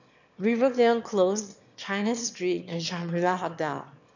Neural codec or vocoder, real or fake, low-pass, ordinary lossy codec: autoencoder, 22.05 kHz, a latent of 192 numbers a frame, VITS, trained on one speaker; fake; 7.2 kHz; none